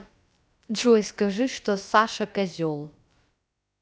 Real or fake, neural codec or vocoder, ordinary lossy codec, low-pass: fake; codec, 16 kHz, about 1 kbps, DyCAST, with the encoder's durations; none; none